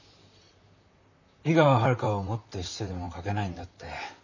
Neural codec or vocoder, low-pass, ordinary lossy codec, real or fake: vocoder, 44.1 kHz, 128 mel bands, Pupu-Vocoder; 7.2 kHz; none; fake